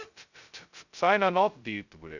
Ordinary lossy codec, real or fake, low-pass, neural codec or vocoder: none; fake; 7.2 kHz; codec, 16 kHz, 0.2 kbps, FocalCodec